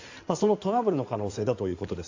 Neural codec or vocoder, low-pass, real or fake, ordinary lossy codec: codec, 16 kHz in and 24 kHz out, 2.2 kbps, FireRedTTS-2 codec; 7.2 kHz; fake; AAC, 48 kbps